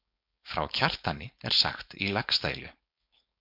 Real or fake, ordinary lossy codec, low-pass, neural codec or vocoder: fake; MP3, 48 kbps; 5.4 kHz; codec, 16 kHz, 4.8 kbps, FACodec